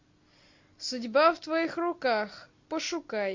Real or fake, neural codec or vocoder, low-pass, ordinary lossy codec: real; none; 7.2 kHz; MP3, 48 kbps